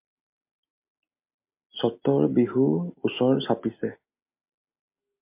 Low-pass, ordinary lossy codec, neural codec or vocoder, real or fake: 3.6 kHz; MP3, 32 kbps; none; real